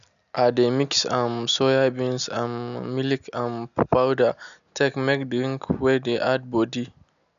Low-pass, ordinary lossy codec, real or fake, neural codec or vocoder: 7.2 kHz; none; real; none